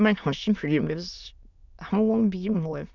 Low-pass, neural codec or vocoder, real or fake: 7.2 kHz; autoencoder, 22.05 kHz, a latent of 192 numbers a frame, VITS, trained on many speakers; fake